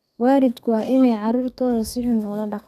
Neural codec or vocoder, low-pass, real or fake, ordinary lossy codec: codec, 32 kHz, 1.9 kbps, SNAC; 14.4 kHz; fake; none